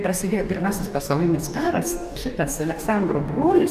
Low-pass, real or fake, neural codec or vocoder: 14.4 kHz; fake; codec, 44.1 kHz, 2.6 kbps, DAC